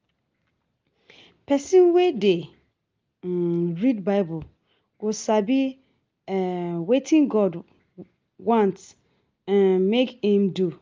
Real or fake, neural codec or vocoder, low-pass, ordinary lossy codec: real; none; 7.2 kHz; Opus, 24 kbps